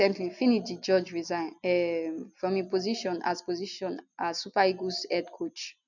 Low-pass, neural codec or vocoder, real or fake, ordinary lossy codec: 7.2 kHz; none; real; none